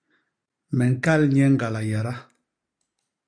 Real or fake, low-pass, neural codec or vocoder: real; 9.9 kHz; none